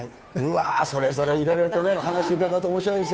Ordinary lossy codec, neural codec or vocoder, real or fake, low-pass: none; codec, 16 kHz, 2 kbps, FunCodec, trained on Chinese and English, 25 frames a second; fake; none